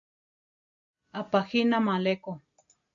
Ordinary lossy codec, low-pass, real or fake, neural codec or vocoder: MP3, 64 kbps; 7.2 kHz; real; none